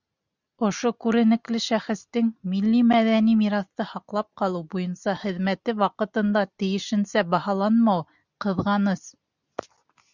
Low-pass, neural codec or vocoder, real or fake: 7.2 kHz; none; real